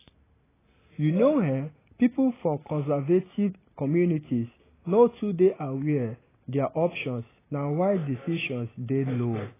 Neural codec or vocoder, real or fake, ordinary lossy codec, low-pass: none; real; AAC, 16 kbps; 3.6 kHz